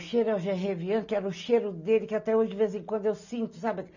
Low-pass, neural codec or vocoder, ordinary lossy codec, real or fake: 7.2 kHz; none; none; real